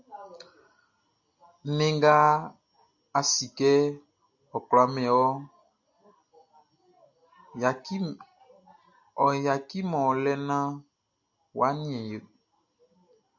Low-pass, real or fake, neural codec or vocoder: 7.2 kHz; real; none